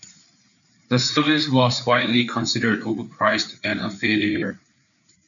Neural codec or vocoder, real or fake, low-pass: codec, 16 kHz, 4 kbps, FreqCodec, larger model; fake; 7.2 kHz